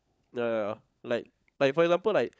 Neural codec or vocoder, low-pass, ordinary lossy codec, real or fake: codec, 16 kHz, 16 kbps, FunCodec, trained on LibriTTS, 50 frames a second; none; none; fake